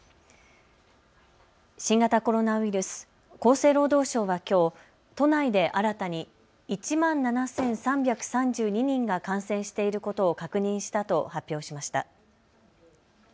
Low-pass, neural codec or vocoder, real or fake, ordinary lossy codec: none; none; real; none